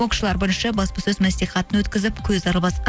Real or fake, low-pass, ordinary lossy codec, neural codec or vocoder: real; none; none; none